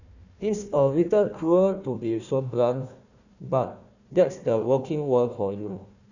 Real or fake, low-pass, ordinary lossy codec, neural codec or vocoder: fake; 7.2 kHz; none; codec, 16 kHz, 1 kbps, FunCodec, trained on Chinese and English, 50 frames a second